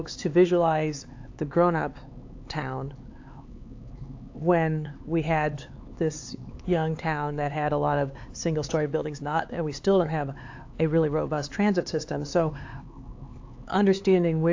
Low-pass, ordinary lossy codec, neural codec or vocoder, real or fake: 7.2 kHz; AAC, 48 kbps; codec, 16 kHz, 4 kbps, X-Codec, HuBERT features, trained on LibriSpeech; fake